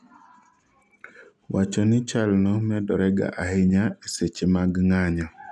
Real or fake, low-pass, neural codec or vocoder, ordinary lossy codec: real; none; none; none